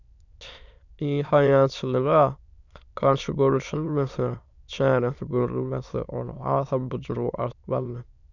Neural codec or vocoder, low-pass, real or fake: autoencoder, 22.05 kHz, a latent of 192 numbers a frame, VITS, trained on many speakers; 7.2 kHz; fake